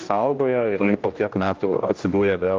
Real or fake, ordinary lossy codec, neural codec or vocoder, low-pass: fake; Opus, 24 kbps; codec, 16 kHz, 1 kbps, X-Codec, HuBERT features, trained on general audio; 7.2 kHz